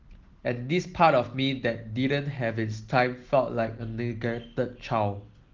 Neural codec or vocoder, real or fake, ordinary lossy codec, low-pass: none; real; Opus, 16 kbps; 7.2 kHz